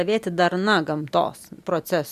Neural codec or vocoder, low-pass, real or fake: none; 14.4 kHz; real